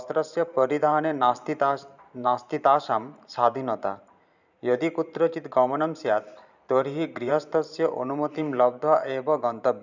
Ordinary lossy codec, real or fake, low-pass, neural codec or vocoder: none; fake; 7.2 kHz; vocoder, 44.1 kHz, 128 mel bands every 512 samples, BigVGAN v2